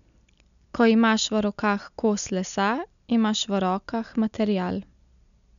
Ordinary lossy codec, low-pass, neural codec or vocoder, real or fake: none; 7.2 kHz; none; real